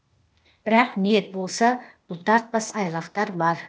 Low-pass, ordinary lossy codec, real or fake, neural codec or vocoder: none; none; fake; codec, 16 kHz, 0.8 kbps, ZipCodec